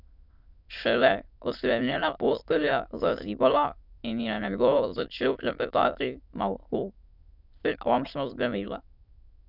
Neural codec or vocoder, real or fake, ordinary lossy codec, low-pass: autoencoder, 22.05 kHz, a latent of 192 numbers a frame, VITS, trained on many speakers; fake; none; 5.4 kHz